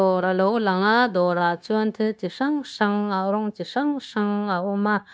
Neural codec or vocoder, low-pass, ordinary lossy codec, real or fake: codec, 16 kHz, 0.9 kbps, LongCat-Audio-Codec; none; none; fake